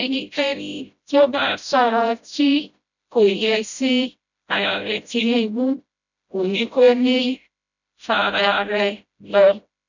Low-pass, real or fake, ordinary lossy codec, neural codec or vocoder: 7.2 kHz; fake; none; codec, 16 kHz, 0.5 kbps, FreqCodec, smaller model